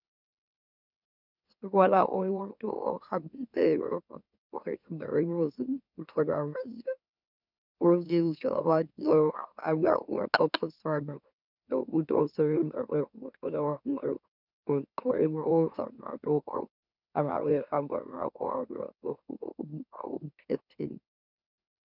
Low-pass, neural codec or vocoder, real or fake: 5.4 kHz; autoencoder, 44.1 kHz, a latent of 192 numbers a frame, MeloTTS; fake